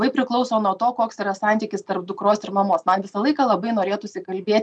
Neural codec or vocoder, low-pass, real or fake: none; 9.9 kHz; real